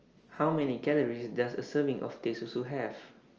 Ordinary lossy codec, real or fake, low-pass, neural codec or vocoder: Opus, 24 kbps; real; 7.2 kHz; none